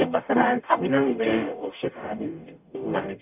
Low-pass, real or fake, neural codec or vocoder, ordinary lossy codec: 3.6 kHz; fake; codec, 44.1 kHz, 0.9 kbps, DAC; none